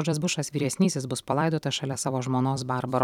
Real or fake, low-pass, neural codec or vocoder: fake; 19.8 kHz; vocoder, 44.1 kHz, 128 mel bands every 256 samples, BigVGAN v2